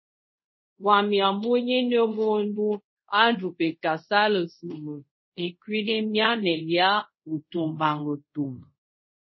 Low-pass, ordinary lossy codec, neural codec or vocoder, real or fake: 7.2 kHz; MP3, 24 kbps; codec, 24 kHz, 0.5 kbps, DualCodec; fake